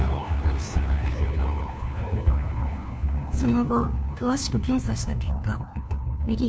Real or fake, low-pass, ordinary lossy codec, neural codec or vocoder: fake; none; none; codec, 16 kHz, 1 kbps, FunCodec, trained on LibriTTS, 50 frames a second